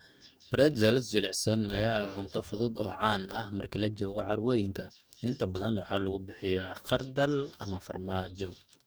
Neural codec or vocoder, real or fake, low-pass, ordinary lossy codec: codec, 44.1 kHz, 2.6 kbps, DAC; fake; none; none